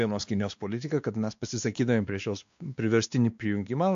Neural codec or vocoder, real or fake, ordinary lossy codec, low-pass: codec, 16 kHz, 2 kbps, X-Codec, WavLM features, trained on Multilingual LibriSpeech; fake; AAC, 64 kbps; 7.2 kHz